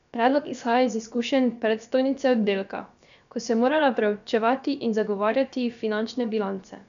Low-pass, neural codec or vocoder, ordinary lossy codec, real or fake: 7.2 kHz; codec, 16 kHz, about 1 kbps, DyCAST, with the encoder's durations; none; fake